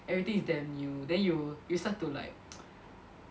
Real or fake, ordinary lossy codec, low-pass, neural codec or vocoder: real; none; none; none